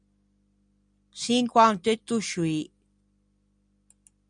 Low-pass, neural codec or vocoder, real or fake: 9.9 kHz; none; real